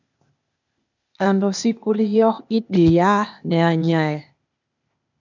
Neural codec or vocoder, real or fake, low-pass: codec, 16 kHz, 0.8 kbps, ZipCodec; fake; 7.2 kHz